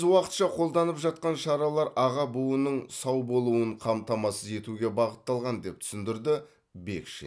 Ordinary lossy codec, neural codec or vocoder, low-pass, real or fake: none; none; none; real